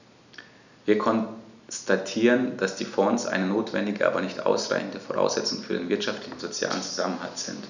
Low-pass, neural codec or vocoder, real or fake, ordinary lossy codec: 7.2 kHz; none; real; none